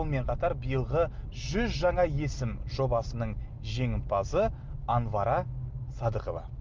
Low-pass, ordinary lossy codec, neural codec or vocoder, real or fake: 7.2 kHz; Opus, 32 kbps; none; real